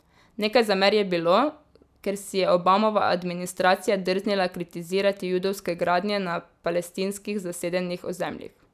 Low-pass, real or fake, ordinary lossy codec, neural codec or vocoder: 14.4 kHz; real; none; none